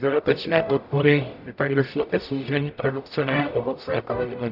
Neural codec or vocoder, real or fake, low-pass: codec, 44.1 kHz, 0.9 kbps, DAC; fake; 5.4 kHz